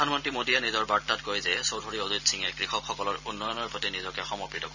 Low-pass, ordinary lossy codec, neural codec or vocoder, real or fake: 7.2 kHz; none; none; real